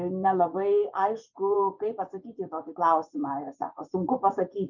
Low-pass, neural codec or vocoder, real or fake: 7.2 kHz; vocoder, 24 kHz, 100 mel bands, Vocos; fake